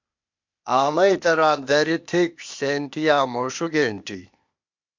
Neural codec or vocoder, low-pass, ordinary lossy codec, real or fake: codec, 16 kHz, 0.8 kbps, ZipCodec; 7.2 kHz; MP3, 64 kbps; fake